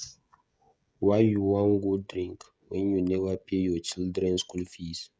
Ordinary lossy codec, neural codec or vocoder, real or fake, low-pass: none; codec, 16 kHz, 16 kbps, FreqCodec, smaller model; fake; none